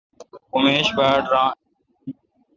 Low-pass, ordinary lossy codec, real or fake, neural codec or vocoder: 7.2 kHz; Opus, 32 kbps; real; none